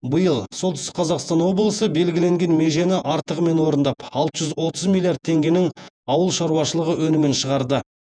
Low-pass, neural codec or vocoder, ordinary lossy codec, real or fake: 9.9 kHz; vocoder, 48 kHz, 128 mel bands, Vocos; none; fake